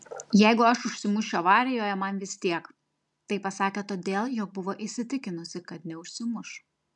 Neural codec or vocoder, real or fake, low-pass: none; real; 10.8 kHz